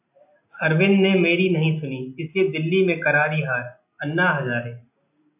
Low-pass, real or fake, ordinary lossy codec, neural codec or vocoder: 3.6 kHz; real; AAC, 32 kbps; none